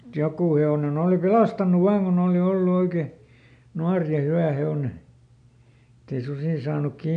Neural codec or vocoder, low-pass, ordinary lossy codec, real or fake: none; 9.9 kHz; none; real